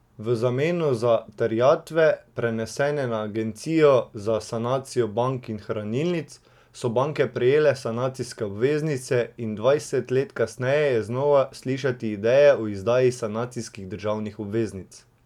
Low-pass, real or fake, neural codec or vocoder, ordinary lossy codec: 19.8 kHz; real; none; none